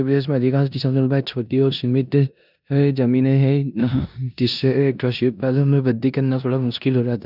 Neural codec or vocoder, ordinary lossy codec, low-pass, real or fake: codec, 16 kHz in and 24 kHz out, 0.9 kbps, LongCat-Audio-Codec, four codebook decoder; none; 5.4 kHz; fake